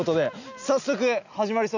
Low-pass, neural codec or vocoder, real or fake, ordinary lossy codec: 7.2 kHz; none; real; none